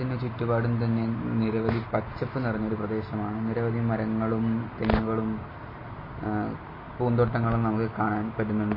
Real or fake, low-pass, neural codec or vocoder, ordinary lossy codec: real; 5.4 kHz; none; MP3, 24 kbps